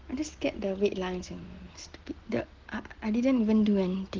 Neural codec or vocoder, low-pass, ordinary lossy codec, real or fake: none; 7.2 kHz; Opus, 16 kbps; real